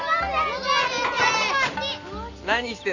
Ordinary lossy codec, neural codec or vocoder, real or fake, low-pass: none; none; real; 7.2 kHz